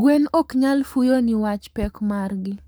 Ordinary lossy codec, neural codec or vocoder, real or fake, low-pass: none; codec, 44.1 kHz, 7.8 kbps, Pupu-Codec; fake; none